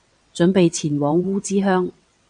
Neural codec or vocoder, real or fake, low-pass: vocoder, 22.05 kHz, 80 mel bands, WaveNeXt; fake; 9.9 kHz